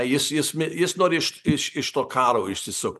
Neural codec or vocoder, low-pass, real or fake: none; 14.4 kHz; real